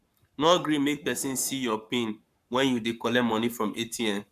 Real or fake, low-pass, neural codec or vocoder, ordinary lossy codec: fake; 14.4 kHz; codec, 44.1 kHz, 7.8 kbps, Pupu-Codec; none